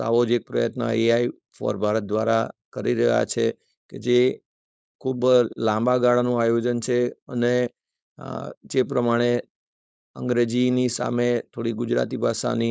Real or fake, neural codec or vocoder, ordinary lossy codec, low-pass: fake; codec, 16 kHz, 4.8 kbps, FACodec; none; none